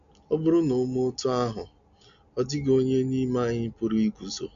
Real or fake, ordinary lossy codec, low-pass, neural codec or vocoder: real; none; 7.2 kHz; none